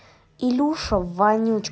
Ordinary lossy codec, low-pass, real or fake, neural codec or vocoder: none; none; real; none